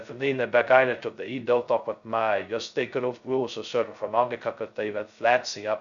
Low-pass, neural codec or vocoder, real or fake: 7.2 kHz; codec, 16 kHz, 0.2 kbps, FocalCodec; fake